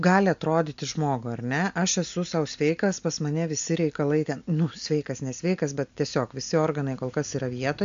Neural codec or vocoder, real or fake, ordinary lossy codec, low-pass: none; real; MP3, 64 kbps; 7.2 kHz